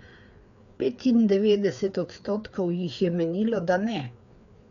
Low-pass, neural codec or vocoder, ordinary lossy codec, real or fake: 7.2 kHz; codec, 16 kHz, 4 kbps, FreqCodec, larger model; none; fake